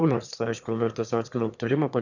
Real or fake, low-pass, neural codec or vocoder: fake; 7.2 kHz; autoencoder, 22.05 kHz, a latent of 192 numbers a frame, VITS, trained on one speaker